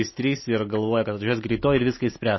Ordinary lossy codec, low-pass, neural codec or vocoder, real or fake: MP3, 24 kbps; 7.2 kHz; none; real